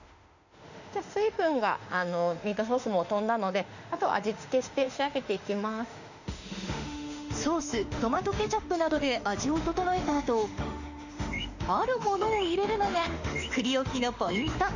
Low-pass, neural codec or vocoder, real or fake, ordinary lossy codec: 7.2 kHz; autoencoder, 48 kHz, 32 numbers a frame, DAC-VAE, trained on Japanese speech; fake; none